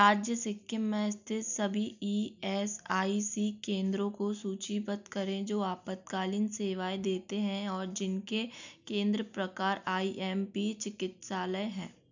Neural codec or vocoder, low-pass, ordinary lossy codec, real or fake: none; 7.2 kHz; none; real